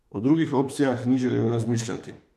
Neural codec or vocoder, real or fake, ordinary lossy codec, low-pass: autoencoder, 48 kHz, 32 numbers a frame, DAC-VAE, trained on Japanese speech; fake; MP3, 96 kbps; 14.4 kHz